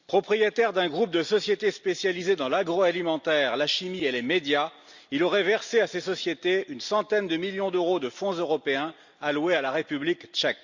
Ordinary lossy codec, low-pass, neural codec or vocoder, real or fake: Opus, 64 kbps; 7.2 kHz; none; real